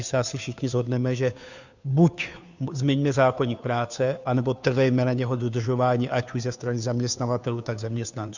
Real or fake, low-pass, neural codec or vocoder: fake; 7.2 kHz; codec, 16 kHz, 2 kbps, FunCodec, trained on Chinese and English, 25 frames a second